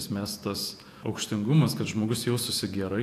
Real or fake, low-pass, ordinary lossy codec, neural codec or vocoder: fake; 14.4 kHz; AAC, 64 kbps; vocoder, 48 kHz, 128 mel bands, Vocos